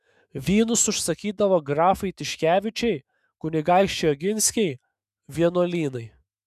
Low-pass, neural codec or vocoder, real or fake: 14.4 kHz; autoencoder, 48 kHz, 128 numbers a frame, DAC-VAE, trained on Japanese speech; fake